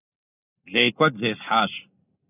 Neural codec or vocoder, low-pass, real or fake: none; 3.6 kHz; real